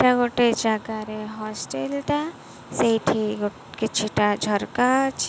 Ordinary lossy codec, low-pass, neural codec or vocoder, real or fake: none; none; none; real